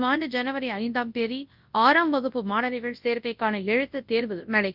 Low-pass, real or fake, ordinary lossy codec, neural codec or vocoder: 5.4 kHz; fake; Opus, 24 kbps; codec, 24 kHz, 0.9 kbps, WavTokenizer, large speech release